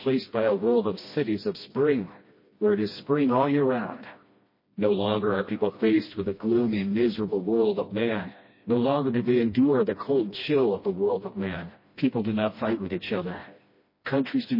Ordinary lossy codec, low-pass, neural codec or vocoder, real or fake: MP3, 24 kbps; 5.4 kHz; codec, 16 kHz, 1 kbps, FreqCodec, smaller model; fake